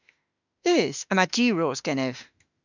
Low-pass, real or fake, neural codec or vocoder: 7.2 kHz; fake; autoencoder, 48 kHz, 32 numbers a frame, DAC-VAE, trained on Japanese speech